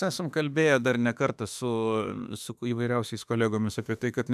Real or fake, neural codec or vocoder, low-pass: fake; autoencoder, 48 kHz, 32 numbers a frame, DAC-VAE, trained on Japanese speech; 14.4 kHz